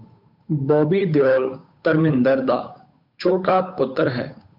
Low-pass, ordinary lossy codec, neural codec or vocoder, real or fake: 5.4 kHz; MP3, 48 kbps; codec, 16 kHz, 8 kbps, FunCodec, trained on Chinese and English, 25 frames a second; fake